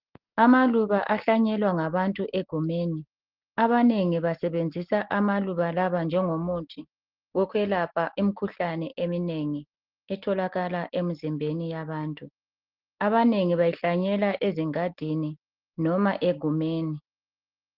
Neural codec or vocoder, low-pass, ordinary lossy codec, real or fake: none; 5.4 kHz; Opus, 16 kbps; real